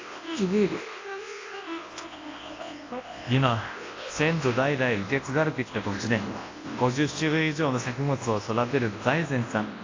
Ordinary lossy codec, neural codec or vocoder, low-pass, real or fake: AAC, 32 kbps; codec, 24 kHz, 0.9 kbps, WavTokenizer, large speech release; 7.2 kHz; fake